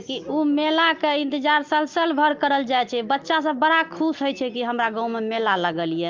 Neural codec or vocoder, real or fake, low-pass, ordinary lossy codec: none; real; 7.2 kHz; Opus, 32 kbps